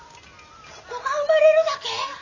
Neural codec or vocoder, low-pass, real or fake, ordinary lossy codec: none; 7.2 kHz; real; none